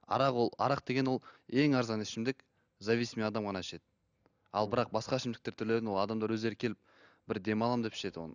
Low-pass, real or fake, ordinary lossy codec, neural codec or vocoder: 7.2 kHz; real; none; none